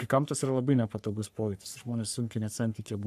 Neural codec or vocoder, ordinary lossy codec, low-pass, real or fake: codec, 44.1 kHz, 3.4 kbps, Pupu-Codec; AAC, 96 kbps; 14.4 kHz; fake